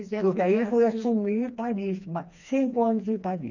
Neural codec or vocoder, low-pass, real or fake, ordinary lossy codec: codec, 16 kHz, 2 kbps, FreqCodec, smaller model; 7.2 kHz; fake; none